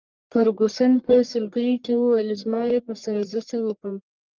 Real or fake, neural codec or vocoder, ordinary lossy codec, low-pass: fake; codec, 44.1 kHz, 1.7 kbps, Pupu-Codec; Opus, 24 kbps; 7.2 kHz